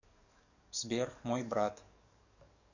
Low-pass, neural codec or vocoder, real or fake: 7.2 kHz; codec, 44.1 kHz, 7.8 kbps, DAC; fake